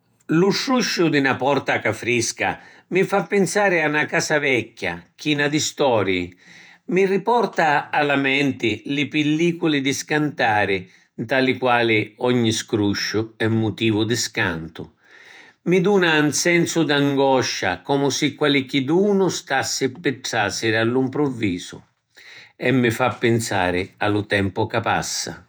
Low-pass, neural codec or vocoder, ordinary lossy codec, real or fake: none; vocoder, 48 kHz, 128 mel bands, Vocos; none; fake